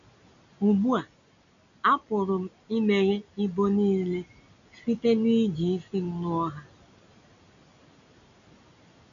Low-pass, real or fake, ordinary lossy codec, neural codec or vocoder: 7.2 kHz; real; MP3, 96 kbps; none